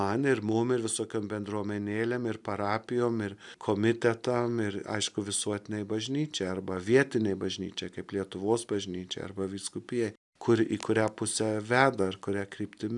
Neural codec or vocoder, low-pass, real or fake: none; 10.8 kHz; real